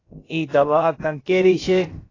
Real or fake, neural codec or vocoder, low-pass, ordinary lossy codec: fake; codec, 16 kHz, about 1 kbps, DyCAST, with the encoder's durations; 7.2 kHz; AAC, 32 kbps